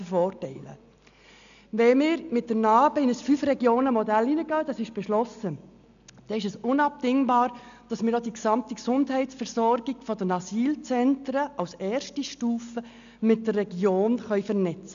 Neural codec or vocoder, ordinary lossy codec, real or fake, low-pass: none; none; real; 7.2 kHz